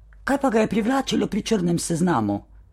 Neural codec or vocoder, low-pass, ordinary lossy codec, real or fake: vocoder, 44.1 kHz, 128 mel bands, Pupu-Vocoder; 19.8 kHz; MP3, 64 kbps; fake